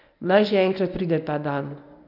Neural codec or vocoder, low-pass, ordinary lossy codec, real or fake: codec, 24 kHz, 0.9 kbps, WavTokenizer, medium speech release version 1; 5.4 kHz; none; fake